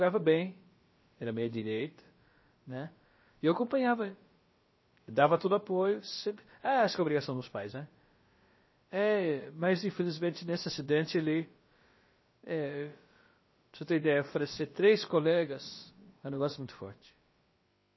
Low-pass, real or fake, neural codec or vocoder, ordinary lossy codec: 7.2 kHz; fake; codec, 16 kHz, about 1 kbps, DyCAST, with the encoder's durations; MP3, 24 kbps